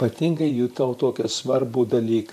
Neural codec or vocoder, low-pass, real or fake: vocoder, 44.1 kHz, 128 mel bands, Pupu-Vocoder; 14.4 kHz; fake